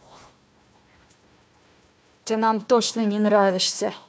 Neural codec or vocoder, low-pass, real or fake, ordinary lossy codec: codec, 16 kHz, 1 kbps, FunCodec, trained on Chinese and English, 50 frames a second; none; fake; none